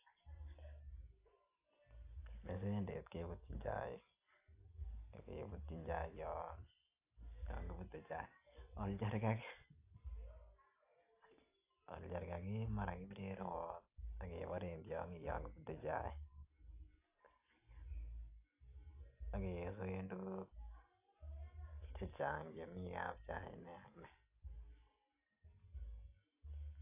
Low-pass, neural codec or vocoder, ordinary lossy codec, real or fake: 3.6 kHz; none; AAC, 24 kbps; real